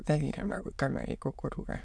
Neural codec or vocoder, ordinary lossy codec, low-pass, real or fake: autoencoder, 22.05 kHz, a latent of 192 numbers a frame, VITS, trained on many speakers; none; none; fake